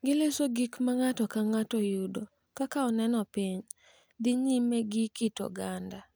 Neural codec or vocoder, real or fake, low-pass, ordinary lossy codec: none; real; none; none